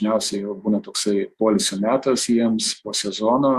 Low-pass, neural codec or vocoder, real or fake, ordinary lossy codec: 14.4 kHz; autoencoder, 48 kHz, 128 numbers a frame, DAC-VAE, trained on Japanese speech; fake; Opus, 64 kbps